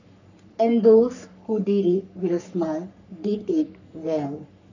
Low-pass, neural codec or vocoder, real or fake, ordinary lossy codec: 7.2 kHz; codec, 44.1 kHz, 3.4 kbps, Pupu-Codec; fake; none